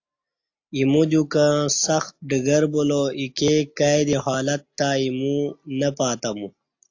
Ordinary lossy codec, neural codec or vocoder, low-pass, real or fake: AAC, 48 kbps; none; 7.2 kHz; real